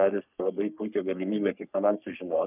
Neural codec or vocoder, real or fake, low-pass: codec, 44.1 kHz, 3.4 kbps, Pupu-Codec; fake; 3.6 kHz